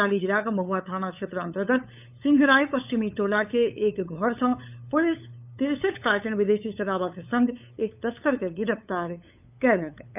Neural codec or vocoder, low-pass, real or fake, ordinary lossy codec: codec, 16 kHz, 8 kbps, FunCodec, trained on LibriTTS, 25 frames a second; 3.6 kHz; fake; none